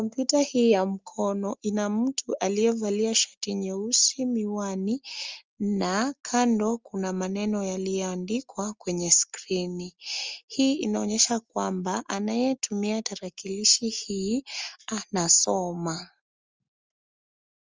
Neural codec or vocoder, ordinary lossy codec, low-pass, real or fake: none; Opus, 32 kbps; 7.2 kHz; real